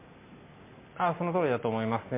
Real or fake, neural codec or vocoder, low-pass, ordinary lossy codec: real; none; 3.6 kHz; MP3, 24 kbps